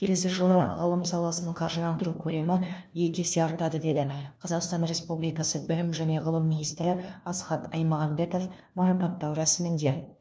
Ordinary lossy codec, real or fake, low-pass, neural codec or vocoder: none; fake; none; codec, 16 kHz, 1 kbps, FunCodec, trained on LibriTTS, 50 frames a second